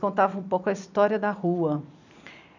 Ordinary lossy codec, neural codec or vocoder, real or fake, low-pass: none; vocoder, 44.1 kHz, 128 mel bands every 256 samples, BigVGAN v2; fake; 7.2 kHz